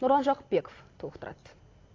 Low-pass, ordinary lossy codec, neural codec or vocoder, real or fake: 7.2 kHz; AAC, 32 kbps; none; real